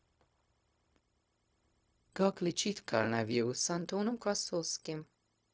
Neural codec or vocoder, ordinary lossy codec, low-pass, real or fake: codec, 16 kHz, 0.4 kbps, LongCat-Audio-Codec; none; none; fake